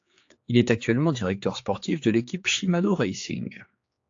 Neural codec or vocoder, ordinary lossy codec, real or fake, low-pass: codec, 16 kHz, 4 kbps, X-Codec, HuBERT features, trained on general audio; AAC, 48 kbps; fake; 7.2 kHz